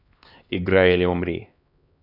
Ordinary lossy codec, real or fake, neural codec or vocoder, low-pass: none; fake; codec, 16 kHz, 2 kbps, X-Codec, HuBERT features, trained on LibriSpeech; 5.4 kHz